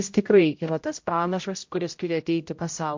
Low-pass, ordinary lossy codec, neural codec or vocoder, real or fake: 7.2 kHz; MP3, 48 kbps; codec, 16 kHz, 0.5 kbps, X-Codec, HuBERT features, trained on general audio; fake